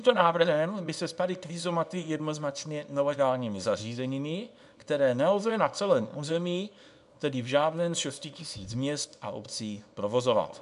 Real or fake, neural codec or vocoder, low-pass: fake; codec, 24 kHz, 0.9 kbps, WavTokenizer, small release; 10.8 kHz